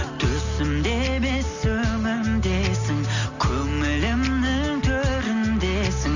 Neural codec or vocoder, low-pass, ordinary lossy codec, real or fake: none; 7.2 kHz; none; real